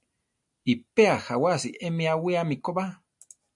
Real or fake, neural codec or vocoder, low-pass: real; none; 10.8 kHz